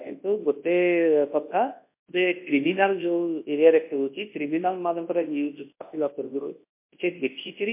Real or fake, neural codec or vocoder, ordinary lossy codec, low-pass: fake; codec, 24 kHz, 0.9 kbps, WavTokenizer, large speech release; MP3, 24 kbps; 3.6 kHz